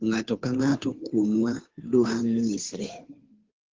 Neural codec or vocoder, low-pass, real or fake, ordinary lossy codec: codec, 16 kHz, 2 kbps, FunCodec, trained on Chinese and English, 25 frames a second; 7.2 kHz; fake; Opus, 16 kbps